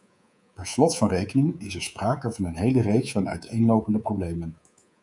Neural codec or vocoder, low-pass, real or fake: codec, 24 kHz, 3.1 kbps, DualCodec; 10.8 kHz; fake